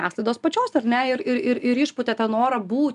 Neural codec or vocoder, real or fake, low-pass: none; real; 10.8 kHz